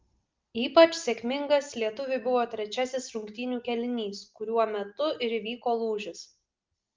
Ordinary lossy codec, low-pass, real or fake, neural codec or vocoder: Opus, 32 kbps; 7.2 kHz; real; none